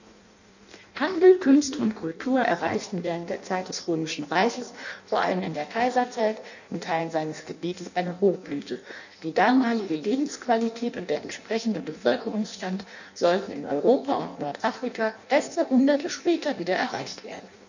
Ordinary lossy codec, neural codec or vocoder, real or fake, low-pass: none; codec, 16 kHz in and 24 kHz out, 0.6 kbps, FireRedTTS-2 codec; fake; 7.2 kHz